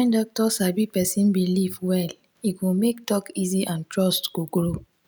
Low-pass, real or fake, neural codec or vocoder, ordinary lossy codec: none; real; none; none